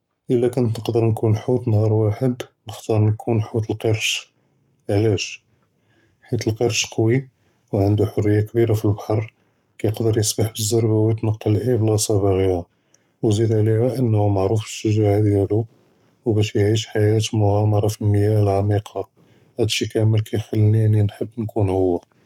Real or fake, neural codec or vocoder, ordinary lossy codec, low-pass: fake; codec, 44.1 kHz, 7.8 kbps, Pupu-Codec; none; 19.8 kHz